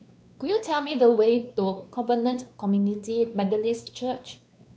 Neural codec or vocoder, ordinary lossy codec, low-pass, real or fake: codec, 16 kHz, 2 kbps, X-Codec, WavLM features, trained on Multilingual LibriSpeech; none; none; fake